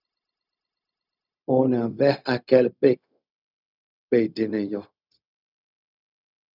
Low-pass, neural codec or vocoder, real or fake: 5.4 kHz; codec, 16 kHz, 0.4 kbps, LongCat-Audio-Codec; fake